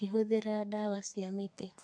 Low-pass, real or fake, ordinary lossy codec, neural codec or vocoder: 9.9 kHz; fake; none; codec, 32 kHz, 1.9 kbps, SNAC